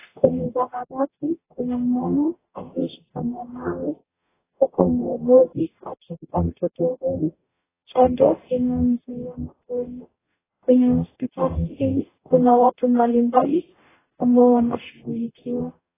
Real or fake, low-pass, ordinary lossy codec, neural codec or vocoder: fake; 3.6 kHz; AAC, 16 kbps; codec, 44.1 kHz, 0.9 kbps, DAC